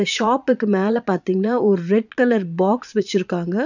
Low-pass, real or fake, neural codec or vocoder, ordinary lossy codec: 7.2 kHz; fake; vocoder, 22.05 kHz, 80 mel bands, Vocos; none